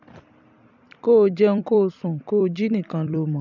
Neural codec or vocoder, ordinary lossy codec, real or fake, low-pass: vocoder, 44.1 kHz, 128 mel bands every 256 samples, BigVGAN v2; none; fake; 7.2 kHz